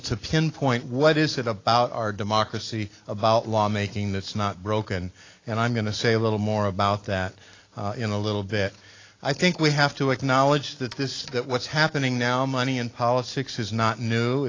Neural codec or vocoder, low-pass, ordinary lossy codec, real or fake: none; 7.2 kHz; AAC, 32 kbps; real